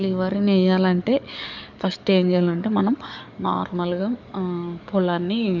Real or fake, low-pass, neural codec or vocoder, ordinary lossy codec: fake; 7.2 kHz; codec, 44.1 kHz, 7.8 kbps, DAC; none